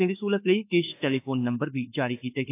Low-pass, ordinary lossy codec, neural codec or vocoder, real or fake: 3.6 kHz; AAC, 24 kbps; autoencoder, 48 kHz, 32 numbers a frame, DAC-VAE, trained on Japanese speech; fake